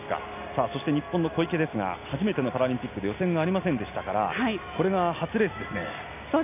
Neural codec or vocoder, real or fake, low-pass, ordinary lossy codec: none; real; 3.6 kHz; none